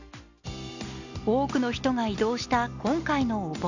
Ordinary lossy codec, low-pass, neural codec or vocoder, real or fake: none; 7.2 kHz; none; real